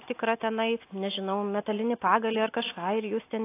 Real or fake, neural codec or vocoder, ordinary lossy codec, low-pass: real; none; AAC, 24 kbps; 3.6 kHz